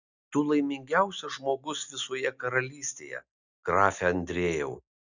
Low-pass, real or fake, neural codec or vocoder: 7.2 kHz; real; none